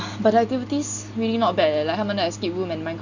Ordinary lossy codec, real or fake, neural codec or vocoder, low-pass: none; real; none; 7.2 kHz